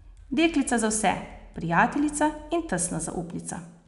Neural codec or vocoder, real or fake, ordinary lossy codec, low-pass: none; real; none; 10.8 kHz